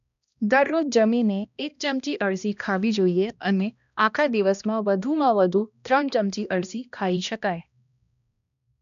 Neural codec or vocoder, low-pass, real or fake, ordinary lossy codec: codec, 16 kHz, 1 kbps, X-Codec, HuBERT features, trained on balanced general audio; 7.2 kHz; fake; none